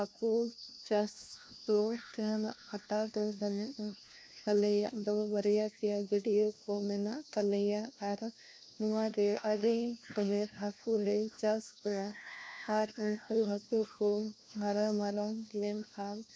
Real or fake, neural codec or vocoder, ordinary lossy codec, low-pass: fake; codec, 16 kHz, 1 kbps, FunCodec, trained on LibriTTS, 50 frames a second; none; none